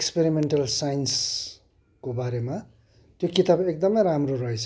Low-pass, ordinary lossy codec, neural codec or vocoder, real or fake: none; none; none; real